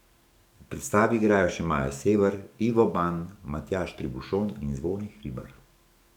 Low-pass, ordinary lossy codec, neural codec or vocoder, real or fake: 19.8 kHz; none; codec, 44.1 kHz, 7.8 kbps, DAC; fake